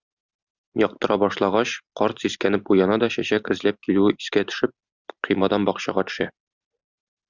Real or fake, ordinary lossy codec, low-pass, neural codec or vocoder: real; Opus, 64 kbps; 7.2 kHz; none